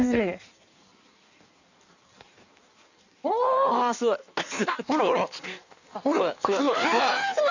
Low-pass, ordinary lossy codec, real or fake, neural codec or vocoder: 7.2 kHz; none; fake; codec, 16 kHz in and 24 kHz out, 1.1 kbps, FireRedTTS-2 codec